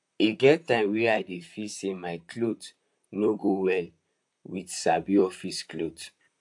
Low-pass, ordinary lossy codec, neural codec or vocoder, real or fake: 10.8 kHz; AAC, 64 kbps; vocoder, 44.1 kHz, 128 mel bands, Pupu-Vocoder; fake